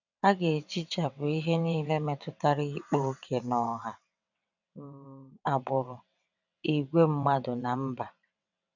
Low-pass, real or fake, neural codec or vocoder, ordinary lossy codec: 7.2 kHz; fake; vocoder, 22.05 kHz, 80 mel bands, WaveNeXt; none